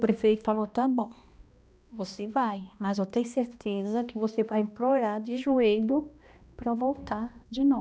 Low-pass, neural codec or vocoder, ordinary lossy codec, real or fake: none; codec, 16 kHz, 1 kbps, X-Codec, HuBERT features, trained on balanced general audio; none; fake